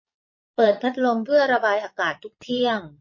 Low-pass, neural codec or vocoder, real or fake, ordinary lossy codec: 7.2 kHz; vocoder, 22.05 kHz, 80 mel bands, Vocos; fake; MP3, 32 kbps